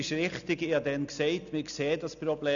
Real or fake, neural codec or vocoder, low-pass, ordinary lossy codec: real; none; 7.2 kHz; none